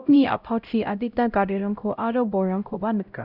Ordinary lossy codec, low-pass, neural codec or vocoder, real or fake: none; 5.4 kHz; codec, 16 kHz, 0.5 kbps, X-Codec, HuBERT features, trained on LibriSpeech; fake